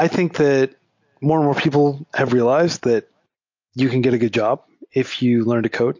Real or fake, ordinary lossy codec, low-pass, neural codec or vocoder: real; MP3, 48 kbps; 7.2 kHz; none